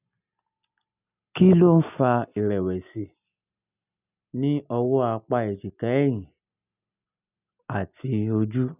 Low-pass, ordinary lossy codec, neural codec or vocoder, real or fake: 3.6 kHz; none; none; real